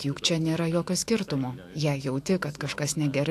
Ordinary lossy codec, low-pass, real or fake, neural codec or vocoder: AAC, 64 kbps; 14.4 kHz; fake; autoencoder, 48 kHz, 128 numbers a frame, DAC-VAE, trained on Japanese speech